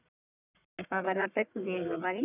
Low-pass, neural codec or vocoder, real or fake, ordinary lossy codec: 3.6 kHz; codec, 44.1 kHz, 1.7 kbps, Pupu-Codec; fake; none